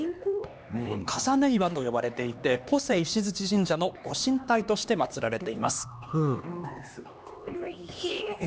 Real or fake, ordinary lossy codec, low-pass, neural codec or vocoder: fake; none; none; codec, 16 kHz, 2 kbps, X-Codec, HuBERT features, trained on LibriSpeech